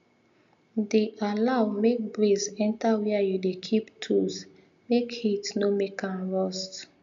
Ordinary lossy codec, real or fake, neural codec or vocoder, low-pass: MP3, 64 kbps; real; none; 7.2 kHz